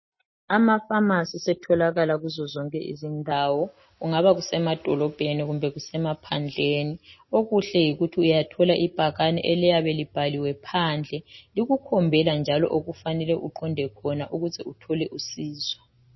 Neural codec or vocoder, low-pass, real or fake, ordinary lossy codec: none; 7.2 kHz; real; MP3, 24 kbps